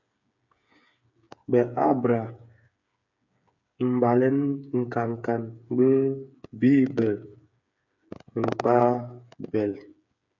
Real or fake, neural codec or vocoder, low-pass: fake; codec, 16 kHz, 8 kbps, FreqCodec, smaller model; 7.2 kHz